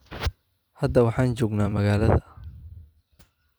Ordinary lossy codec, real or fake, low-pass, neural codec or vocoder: none; fake; none; vocoder, 44.1 kHz, 128 mel bands every 256 samples, BigVGAN v2